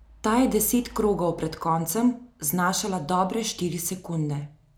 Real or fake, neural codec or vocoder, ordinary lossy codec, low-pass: real; none; none; none